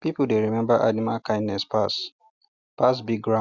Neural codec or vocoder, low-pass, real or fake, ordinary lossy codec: none; 7.2 kHz; real; Opus, 64 kbps